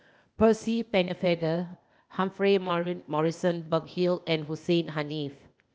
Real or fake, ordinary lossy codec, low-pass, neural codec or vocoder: fake; none; none; codec, 16 kHz, 0.8 kbps, ZipCodec